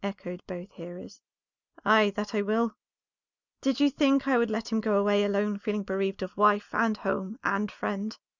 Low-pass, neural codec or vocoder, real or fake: 7.2 kHz; none; real